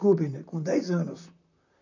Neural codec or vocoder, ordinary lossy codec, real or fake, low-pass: vocoder, 44.1 kHz, 128 mel bands, Pupu-Vocoder; none; fake; 7.2 kHz